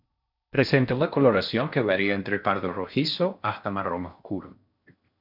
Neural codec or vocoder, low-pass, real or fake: codec, 16 kHz in and 24 kHz out, 0.6 kbps, FocalCodec, streaming, 4096 codes; 5.4 kHz; fake